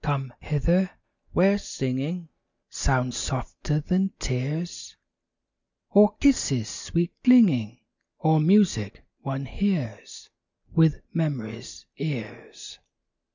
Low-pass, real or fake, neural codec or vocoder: 7.2 kHz; real; none